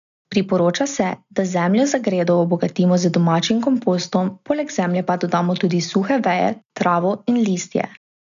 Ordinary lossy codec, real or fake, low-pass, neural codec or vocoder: none; real; 7.2 kHz; none